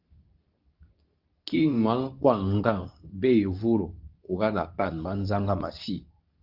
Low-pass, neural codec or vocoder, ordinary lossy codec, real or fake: 5.4 kHz; codec, 24 kHz, 0.9 kbps, WavTokenizer, medium speech release version 2; Opus, 24 kbps; fake